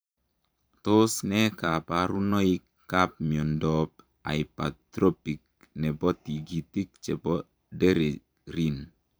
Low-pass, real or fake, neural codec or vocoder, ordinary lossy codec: none; fake; vocoder, 44.1 kHz, 128 mel bands every 512 samples, BigVGAN v2; none